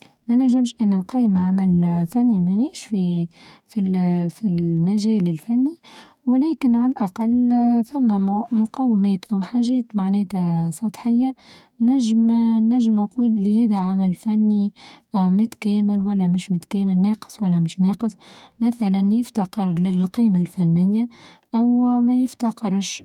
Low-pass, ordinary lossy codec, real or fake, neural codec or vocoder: 19.8 kHz; none; fake; codec, 44.1 kHz, 2.6 kbps, DAC